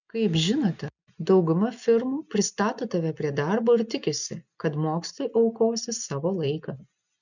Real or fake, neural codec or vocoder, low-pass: real; none; 7.2 kHz